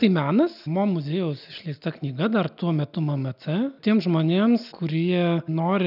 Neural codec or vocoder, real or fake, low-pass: none; real; 5.4 kHz